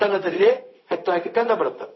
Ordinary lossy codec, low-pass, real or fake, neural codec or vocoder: MP3, 24 kbps; 7.2 kHz; fake; codec, 16 kHz, 0.4 kbps, LongCat-Audio-Codec